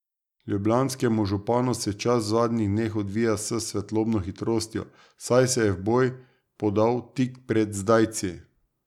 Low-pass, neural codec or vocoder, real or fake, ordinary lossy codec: 19.8 kHz; none; real; none